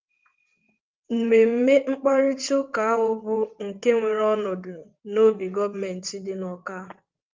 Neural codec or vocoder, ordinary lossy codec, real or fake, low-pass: vocoder, 22.05 kHz, 80 mel bands, WaveNeXt; Opus, 24 kbps; fake; 7.2 kHz